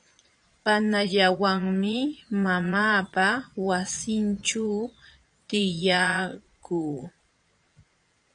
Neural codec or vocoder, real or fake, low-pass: vocoder, 22.05 kHz, 80 mel bands, Vocos; fake; 9.9 kHz